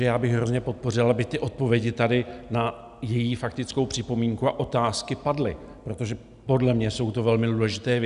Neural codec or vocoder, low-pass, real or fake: none; 10.8 kHz; real